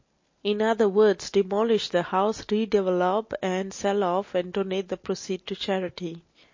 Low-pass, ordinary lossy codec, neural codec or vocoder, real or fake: 7.2 kHz; MP3, 32 kbps; none; real